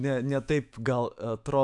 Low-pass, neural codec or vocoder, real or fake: 10.8 kHz; autoencoder, 48 kHz, 128 numbers a frame, DAC-VAE, trained on Japanese speech; fake